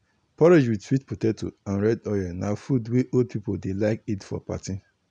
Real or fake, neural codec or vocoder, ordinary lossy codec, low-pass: real; none; none; 9.9 kHz